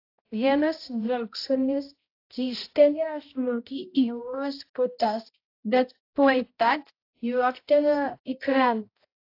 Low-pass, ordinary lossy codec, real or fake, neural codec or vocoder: 5.4 kHz; AAC, 32 kbps; fake; codec, 16 kHz, 0.5 kbps, X-Codec, HuBERT features, trained on balanced general audio